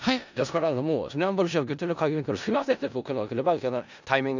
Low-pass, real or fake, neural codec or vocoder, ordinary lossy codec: 7.2 kHz; fake; codec, 16 kHz in and 24 kHz out, 0.4 kbps, LongCat-Audio-Codec, four codebook decoder; none